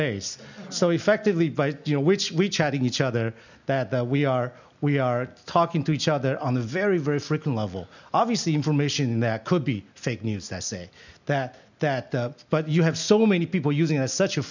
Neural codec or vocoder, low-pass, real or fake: none; 7.2 kHz; real